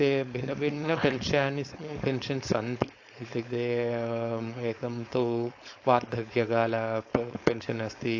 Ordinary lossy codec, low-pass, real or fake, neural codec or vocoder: AAC, 48 kbps; 7.2 kHz; fake; codec, 16 kHz, 4.8 kbps, FACodec